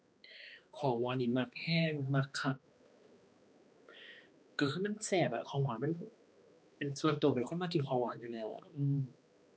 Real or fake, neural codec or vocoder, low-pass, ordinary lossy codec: fake; codec, 16 kHz, 2 kbps, X-Codec, HuBERT features, trained on balanced general audio; none; none